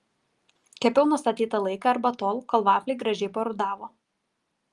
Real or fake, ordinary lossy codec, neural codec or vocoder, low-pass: real; Opus, 32 kbps; none; 10.8 kHz